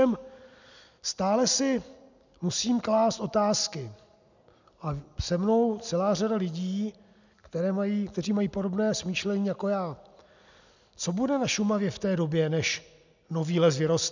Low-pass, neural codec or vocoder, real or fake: 7.2 kHz; none; real